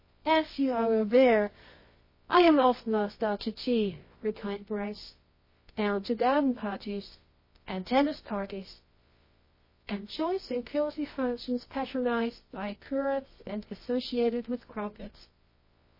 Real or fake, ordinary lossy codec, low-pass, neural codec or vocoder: fake; MP3, 24 kbps; 5.4 kHz; codec, 24 kHz, 0.9 kbps, WavTokenizer, medium music audio release